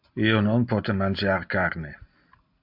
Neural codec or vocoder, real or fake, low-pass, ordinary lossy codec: none; real; 5.4 kHz; MP3, 48 kbps